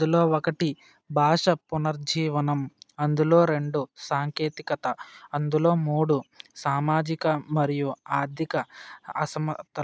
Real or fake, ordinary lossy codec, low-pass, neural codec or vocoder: real; none; none; none